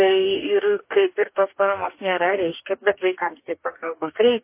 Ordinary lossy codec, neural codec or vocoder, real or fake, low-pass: MP3, 24 kbps; codec, 44.1 kHz, 2.6 kbps, DAC; fake; 3.6 kHz